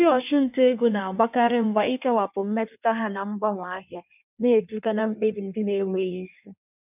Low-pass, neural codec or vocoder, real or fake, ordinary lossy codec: 3.6 kHz; codec, 16 kHz in and 24 kHz out, 1.1 kbps, FireRedTTS-2 codec; fake; none